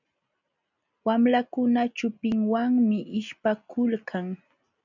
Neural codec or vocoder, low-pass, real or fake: none; 7.2 kHz; real